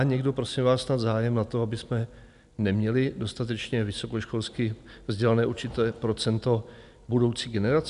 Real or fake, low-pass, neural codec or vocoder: real; 10.8 kHz; none